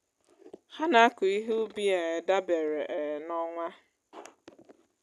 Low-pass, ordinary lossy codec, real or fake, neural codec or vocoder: none; none; real; none